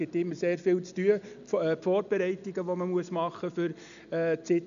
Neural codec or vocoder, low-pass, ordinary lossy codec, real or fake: none; 7.2 kHz; none; real